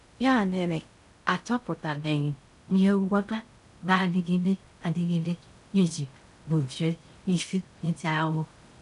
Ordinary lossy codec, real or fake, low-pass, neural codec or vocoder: none; fake; 10.8 kHz; codec, 16 kHz in and 24 kHz out, 0.6 kbps, FocalCodec, streaming, 2048 codes